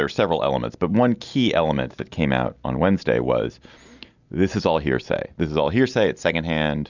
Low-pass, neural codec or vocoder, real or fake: 7.2 kHz; none; real